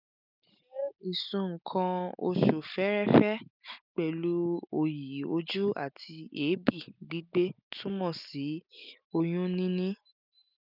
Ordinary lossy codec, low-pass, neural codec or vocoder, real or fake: none; 5.4 kHz; none; real